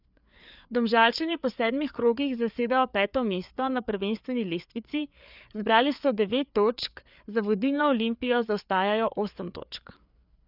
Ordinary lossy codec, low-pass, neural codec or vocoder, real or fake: none; 5.4 kHz; codec, 16 kHz, 4 kbps, FreqCodec, larger model; fake